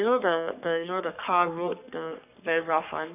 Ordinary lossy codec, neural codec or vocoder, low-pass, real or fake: none; codec, 44.1 kHz, 3.4 kbps, Pupu-Codec; 3.6 kHz; fake